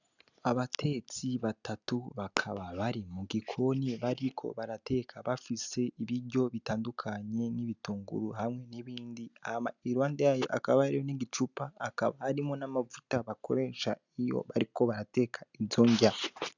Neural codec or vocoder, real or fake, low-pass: none; real; 7.2 kHz